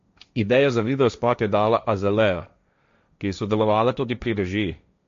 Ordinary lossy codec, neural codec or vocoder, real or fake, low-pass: MP3, 48 kbps; codec, 16 kHz, 1.1 kbps, Voila-Tokenizer; fake; 7.2 kHz